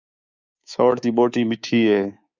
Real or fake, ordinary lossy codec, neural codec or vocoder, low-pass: fake; Opus, 64 kbps; codec, 24 kHz, 3.1 kbps, DualCodec; 7.2 kHz